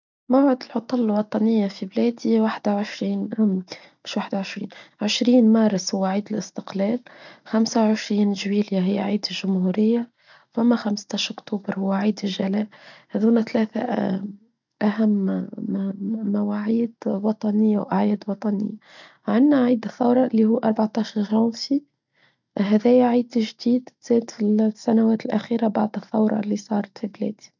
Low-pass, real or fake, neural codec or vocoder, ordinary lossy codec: 7.2 kHz; real; none; none